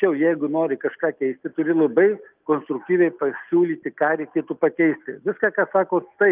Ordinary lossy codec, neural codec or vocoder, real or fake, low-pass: Opus, 24 kbps; none; real; 3.6 kHz